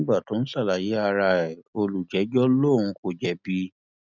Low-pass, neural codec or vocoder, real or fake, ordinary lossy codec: 7.2 kHz; none; real; none